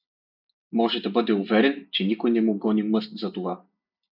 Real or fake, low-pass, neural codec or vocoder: real; 5.4 kHz; none